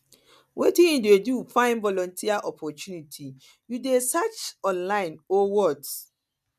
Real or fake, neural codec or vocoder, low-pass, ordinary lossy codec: real; none; 14.4 kHz; none